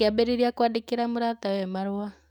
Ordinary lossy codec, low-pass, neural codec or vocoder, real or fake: none; 19.8 kHz; autoencoder, 48 kHz, 128 numbers a frame, DAC-VAE, trained on Japanese speech; fake